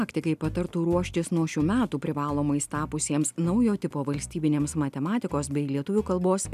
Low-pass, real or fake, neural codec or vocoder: 14.4 kHz; real; none